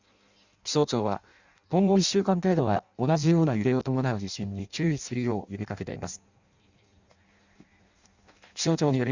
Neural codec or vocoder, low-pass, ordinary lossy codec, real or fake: codec, 16 kHz in and 24 kHz out, 0.6 kbps, FireRedTTS-2 codec; 7.2 kHz; Opus, 64 kbps; fake